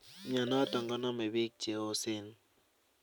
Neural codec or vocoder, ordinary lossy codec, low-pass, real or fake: none; none; none; real